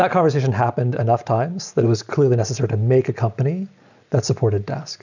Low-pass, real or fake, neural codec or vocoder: 7.2 kHz; real; none